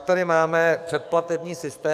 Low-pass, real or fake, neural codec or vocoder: 14.4 kHz; fake; codec, 44.1 kHz, 7.8 kbps, DAC